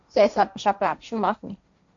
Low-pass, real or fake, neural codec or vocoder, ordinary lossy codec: 7.2 kHz; fake; codec, 16 kHz, 1.1 kbps, Voila-Tokenizer; AAC, 64 kbps